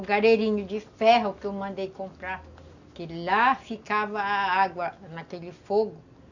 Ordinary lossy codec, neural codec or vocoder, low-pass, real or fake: none; none; 7.2 kHz; real